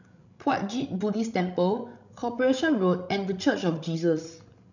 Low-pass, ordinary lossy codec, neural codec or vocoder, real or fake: 7.2 kHz; none; codec, 16 kHz, 16 kbps, FreqCodec, larger model; fake